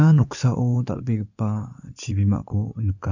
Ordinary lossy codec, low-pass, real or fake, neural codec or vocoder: none; 7.2 kHz; fake; codec, 16 kHz in and 24 kHz out, 2.2 kbps, FireRedTTS-2 codec